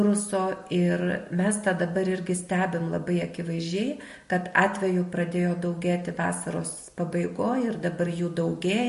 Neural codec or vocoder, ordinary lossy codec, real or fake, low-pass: none; MP3, 48 kbps; real; 14.4 kHz